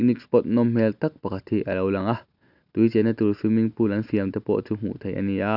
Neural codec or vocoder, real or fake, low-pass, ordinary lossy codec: none; real; 5.4 kHz; none